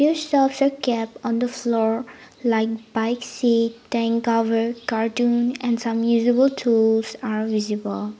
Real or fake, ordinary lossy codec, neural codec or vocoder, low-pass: real; none; none; none